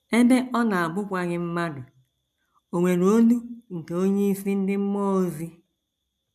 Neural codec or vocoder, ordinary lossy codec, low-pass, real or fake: none; none; 14.4 kHz; real